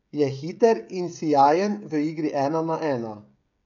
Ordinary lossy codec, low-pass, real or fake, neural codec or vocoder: none; 7.2 kHz; fake; codec, 16 kHz, 16 kbps, FreqCodec, smaller model